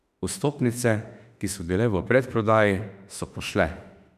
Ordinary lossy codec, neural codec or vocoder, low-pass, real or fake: none; autoencoder, 48 kHz, 32 numbers a frame, DAC-VAE, trained on Japanese speech; 14.4 kHz; fake